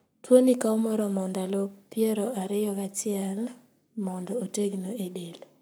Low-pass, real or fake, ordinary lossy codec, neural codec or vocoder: none; fake; none; codec, 44.1 kHz, 7.8 kbps, Pupu-Codec